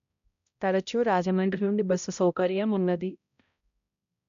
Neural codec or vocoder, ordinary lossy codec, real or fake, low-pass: codec, 16 kHz, 0.5 kbps, X-Codec, HuBERT features, trained on balanced general audio; none; fake; 7.2 kHz